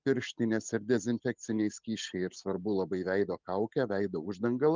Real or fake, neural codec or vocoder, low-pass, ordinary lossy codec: real; none; 7.2 kHz; Opus, 24 kbps